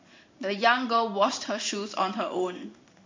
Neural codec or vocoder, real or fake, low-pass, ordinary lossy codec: codec, 16 kHz in and 24 kHz out, 1 kbps, XY-Tokenizer; fake; 7.2 kHz; MP3, 64 kbps